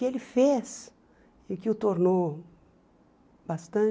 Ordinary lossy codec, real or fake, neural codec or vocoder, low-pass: none; real; none; none